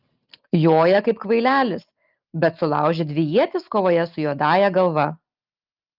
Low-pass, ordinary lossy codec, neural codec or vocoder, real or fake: 5.4 kHz; Opus, 24 kbps; none; real